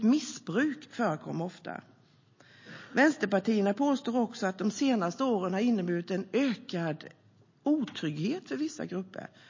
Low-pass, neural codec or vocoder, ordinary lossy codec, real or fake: 7.2 kHz; none; MP3, 32 kbps; real